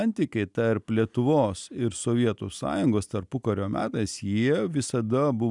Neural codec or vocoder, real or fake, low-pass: none; real; 10.8 kHz